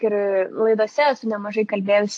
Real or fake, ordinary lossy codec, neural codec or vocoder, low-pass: real; AAC, 48 kbps; none; 9.9 kHz